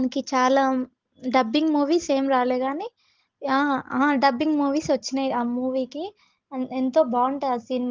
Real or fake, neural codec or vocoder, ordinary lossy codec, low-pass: real; none; Opus, 16 kbps; 7.2 kHz